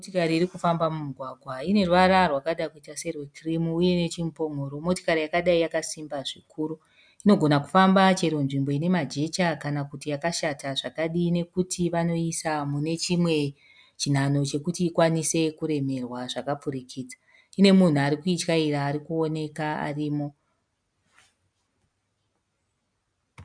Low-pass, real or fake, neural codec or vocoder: 9.9 kHz; real; none